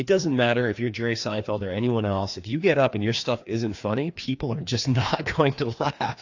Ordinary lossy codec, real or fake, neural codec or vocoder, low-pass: AAC, 48 kbps; fake; codec, 16 kHz, 2 kbps, FreqCodec, larger model; 7.2 kHz